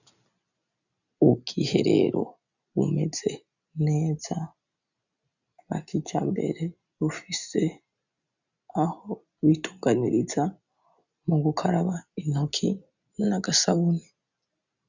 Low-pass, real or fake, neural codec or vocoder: 7.2 kHz; real; none